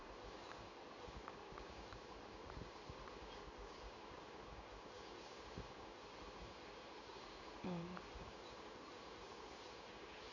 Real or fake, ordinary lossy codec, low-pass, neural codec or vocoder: fake; none; 7.2 kHz; codec, 16 kHz in and 24 kHz out, 1 kbps, XY-Tokenizer